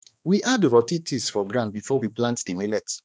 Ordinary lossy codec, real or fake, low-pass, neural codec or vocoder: none; fake; none; codec, 16 kHz, 2 kbps, X-Codec, HuBERT features, trained on balanced general audio